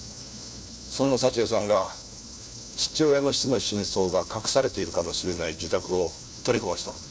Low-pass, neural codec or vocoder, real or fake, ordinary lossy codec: none; codec, 16 kHz, 1 kbps, FunCodec, trained on LibriTTS, 50 frames a second; fake; none